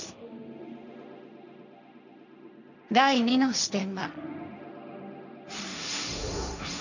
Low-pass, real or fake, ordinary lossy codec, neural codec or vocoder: 7.2 kHz; fake; none; codec, 16 kHz, 1.1 kbps, Voila-Tokenizer